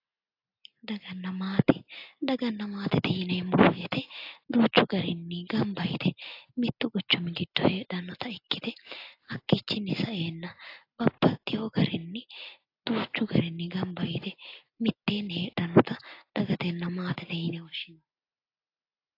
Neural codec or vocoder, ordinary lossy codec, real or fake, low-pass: none; AAC, 32 kbps; real; 5.4 kHz